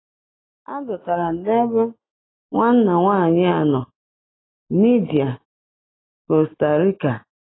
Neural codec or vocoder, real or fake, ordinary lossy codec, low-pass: none; real; AAC, 16 kbps; 7.2 kHz